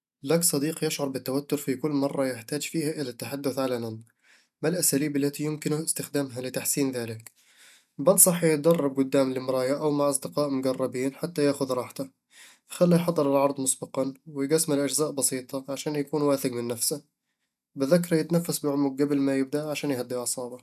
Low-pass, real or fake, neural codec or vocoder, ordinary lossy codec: 14.4 kHz; real; none; none